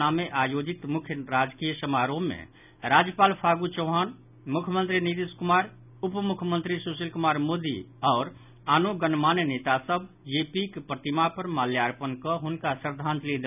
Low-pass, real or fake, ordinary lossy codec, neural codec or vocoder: 3.6 kHz; real; none; none